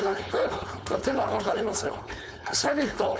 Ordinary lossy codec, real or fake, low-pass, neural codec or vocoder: none; fake; none; codec, 16 kHz, 4.8 kbps, FACodec